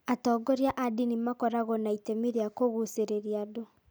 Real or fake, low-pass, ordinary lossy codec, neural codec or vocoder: real; none; none; none